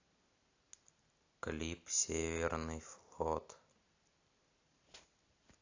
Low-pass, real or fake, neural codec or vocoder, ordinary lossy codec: 7.2 kHz; real; none; AAC, 48 kbps